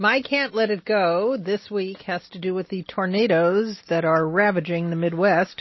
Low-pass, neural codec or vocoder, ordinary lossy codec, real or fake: 7.2 kHz; none; MP3, 24 kbps; real